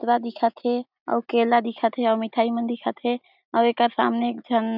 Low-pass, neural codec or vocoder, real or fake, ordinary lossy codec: 5.4 kHz; none; real; none